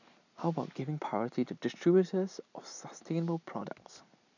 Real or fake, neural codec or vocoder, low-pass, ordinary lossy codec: real; none; 7.2 kHz; none